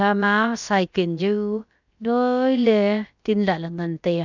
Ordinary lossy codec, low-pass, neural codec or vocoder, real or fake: none; 7.2 kHz; codec, 16 kHz, about 1 kbps, DyCAST, with the encoder's durations; fake